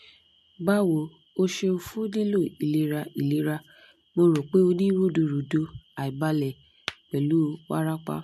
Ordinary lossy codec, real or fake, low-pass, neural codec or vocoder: MP3, 64 kbps; real; 14.4 kHz; none